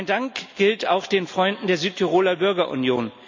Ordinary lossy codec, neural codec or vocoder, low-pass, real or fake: MP3, 64 kbps; none; 7.2 kHz; real